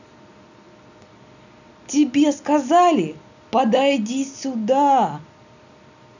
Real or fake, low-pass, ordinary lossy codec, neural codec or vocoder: real; 7.2 kHz; none; none